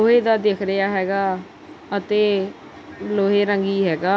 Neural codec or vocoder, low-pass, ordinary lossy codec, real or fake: none; none; none; real